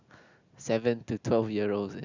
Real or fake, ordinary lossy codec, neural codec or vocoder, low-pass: real; none; none; 7.2 kHz